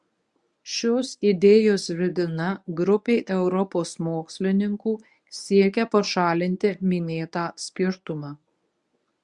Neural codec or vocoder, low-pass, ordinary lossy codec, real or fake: codec, 24 kHz, 0.9 kbps, WavTokenizer, medium speech release version 1; 10.8 kHz; Opus, 64 kbps; fake